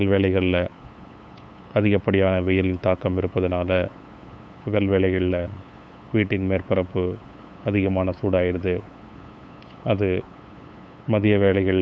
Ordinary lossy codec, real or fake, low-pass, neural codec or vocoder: none; fake; none; codec, 16 kHz, 8 kbps, FunCodec, trained on LibriTTS, 25 frames a second